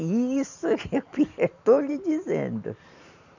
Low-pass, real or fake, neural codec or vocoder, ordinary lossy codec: 7.2 kHz; fake; vocoder, 44.1 kHz, 128 mel bands every 512 samples, BigVGAN v2; none